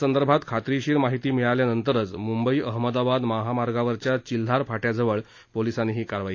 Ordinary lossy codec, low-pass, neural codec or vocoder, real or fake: AAC, 48 kbps; 7.2 kHz; none; real